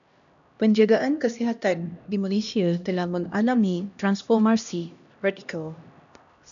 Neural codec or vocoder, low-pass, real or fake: codec, 16 kHz, 1 kbps, X-Codec, HuBERT features, trained on LibriSpeech; 7.2 kHz; fake